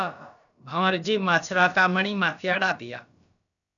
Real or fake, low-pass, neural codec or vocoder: fake; 7.2 kHz; codec, 16 kHz, about 1 kbps, DyCAST, with the encoder's durations